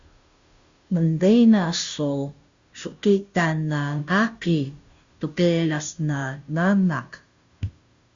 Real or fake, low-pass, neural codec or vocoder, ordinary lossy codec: fake; 7.2 kHz; codec, 16 kHz, 0.5 kbps, FunCodec, trained on Chinese and English, 25 frames a second; Opus, 64 kbps